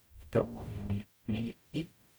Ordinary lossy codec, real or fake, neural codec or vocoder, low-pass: none; fake; codec, 44.1 kHz, 0.9 kbps, DAC; none